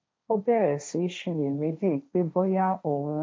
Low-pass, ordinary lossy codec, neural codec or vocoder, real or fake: none; none; codec, 16 kHz, 1.1 kbps, Voila-Tokenizer; fake